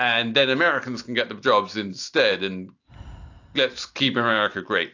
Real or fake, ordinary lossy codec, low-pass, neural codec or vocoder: real; AAC, 48 kbps; 7.2 kHz; none